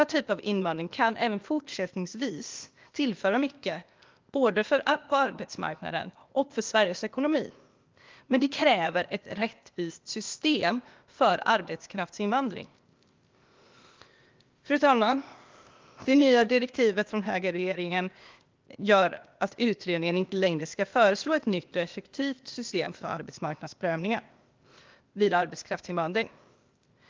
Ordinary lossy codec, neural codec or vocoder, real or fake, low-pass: Opus, 24 kbps; codec, 16 kHz, 0.8 kbps, ZipCodec; fake; 7.2 kHz